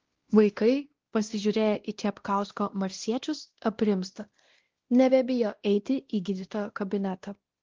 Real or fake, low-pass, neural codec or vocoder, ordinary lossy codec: fake; 7.2 kHz; codec, 16 kHz, 1 kbps, X-Codec, WavLM features, trained on Multilingual LibriSpeech; Opus, 16 kbps